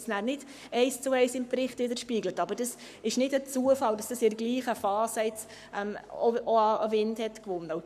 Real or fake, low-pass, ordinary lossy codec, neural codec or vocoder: fake; 14.4 kHz; none; codec, 44.1 kHz, 7.8 kbps, Pupu-Codec